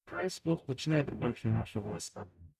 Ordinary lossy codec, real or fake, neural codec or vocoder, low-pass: none; fake; codec, 44.1 kHz, 0.9 kbps, DAC; 14.4 kHz